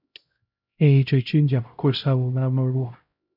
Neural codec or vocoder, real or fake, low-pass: codec, 16 kHz, 0.5 kbps, X-Codec, HuBERT features, trained on LibriSpeech; fake; 5.4 kHz